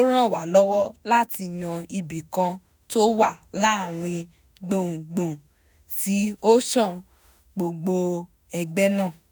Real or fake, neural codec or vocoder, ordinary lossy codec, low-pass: fake; autoencoder, 48 kHz, 32 numbers a frame, DAC-VAE, trained on Japanese speech; none; none